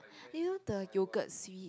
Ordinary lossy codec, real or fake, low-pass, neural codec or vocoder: none; real; none; none